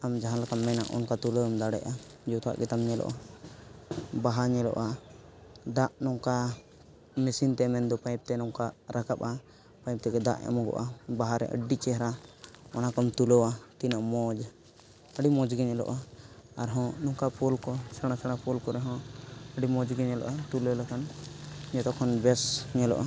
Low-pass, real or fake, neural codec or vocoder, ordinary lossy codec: none; real; none; none